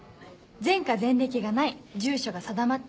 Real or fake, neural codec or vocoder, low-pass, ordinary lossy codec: real; none; none; none